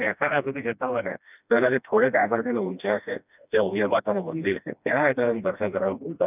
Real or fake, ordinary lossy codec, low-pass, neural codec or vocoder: fake; none; 3.6 kHz; codec, 16 kHz, 1 kbps, FreqCodec, smaller model